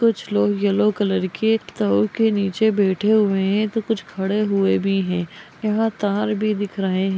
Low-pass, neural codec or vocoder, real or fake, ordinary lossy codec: none; none; real; none